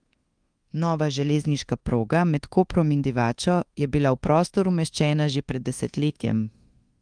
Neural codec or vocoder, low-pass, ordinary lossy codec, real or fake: codec, 24 kHz, 1.2 kbps, DualCodec; 9.9 kHz; Opus, 24 kbps; fake